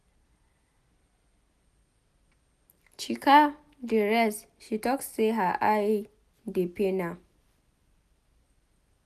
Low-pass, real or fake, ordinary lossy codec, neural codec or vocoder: 14.4 kHz; real; none; none